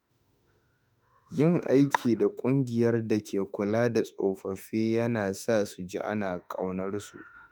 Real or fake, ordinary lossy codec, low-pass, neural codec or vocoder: fake; none; none; autoencoder, 48 kHz, 32 numbers a frame, DAC-VAE, trained on Japanese speech